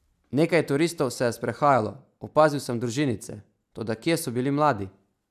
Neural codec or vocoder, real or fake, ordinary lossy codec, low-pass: none; real; none; 14.4 kHz